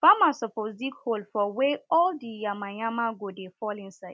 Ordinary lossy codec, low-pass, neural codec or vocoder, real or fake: none; none; none; real